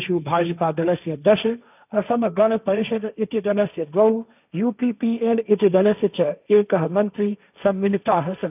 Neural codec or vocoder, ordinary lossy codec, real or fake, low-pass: codec, 16 kHz, 1.1 kbps, Voila-Tokenizer; none; fake; 3.6 kHz